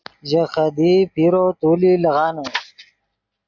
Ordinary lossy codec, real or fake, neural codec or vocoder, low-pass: AAC, 48 kbps; real; none; 7.2 kHz